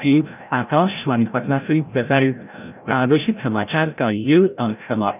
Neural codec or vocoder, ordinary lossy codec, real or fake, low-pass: codec, 16 kHz, 0.5 kbps, FreqCodec, larger model; none; fake; 3.6 kHz